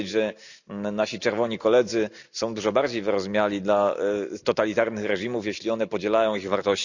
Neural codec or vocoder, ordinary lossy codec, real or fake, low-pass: none; none; real; 7.2 kHz